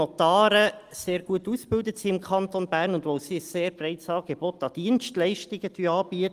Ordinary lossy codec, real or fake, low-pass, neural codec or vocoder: Opus, 24 kbps; real; 14.4 kHz; none